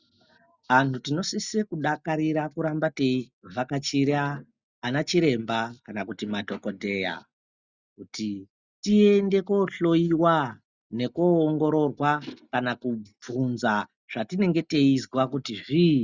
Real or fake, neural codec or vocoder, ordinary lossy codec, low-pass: real; none; Opus, 64 kbps; 7.2 kHz